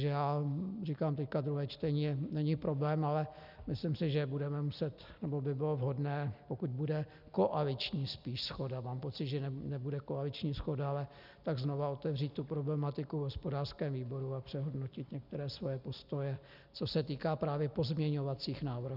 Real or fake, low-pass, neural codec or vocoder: real; 5.4 kHz; none